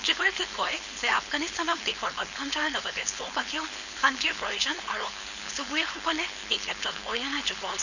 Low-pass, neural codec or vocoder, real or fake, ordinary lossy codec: 7.2 kHz; codec, 16 kHz, 4.8 kbps, FACodec; fake; none